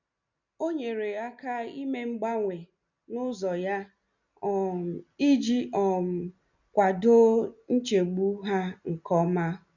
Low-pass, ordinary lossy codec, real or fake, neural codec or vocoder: 7.2 kHz; none; real; none